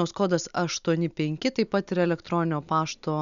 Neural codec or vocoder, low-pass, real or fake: none; 7.2 kHz; real